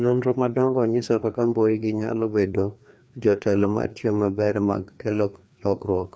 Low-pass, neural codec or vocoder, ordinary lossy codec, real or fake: none; codec, 16 kHz, 2 kbps, FreqCodec, larger model; none; fake